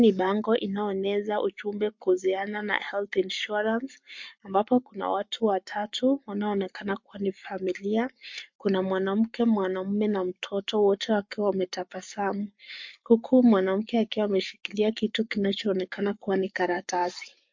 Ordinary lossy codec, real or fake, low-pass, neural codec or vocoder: MP3, 48 kbps; fake; 7.2 kHz; codec, 44.1 kHz, 7.8 kbps, Pupu-Codec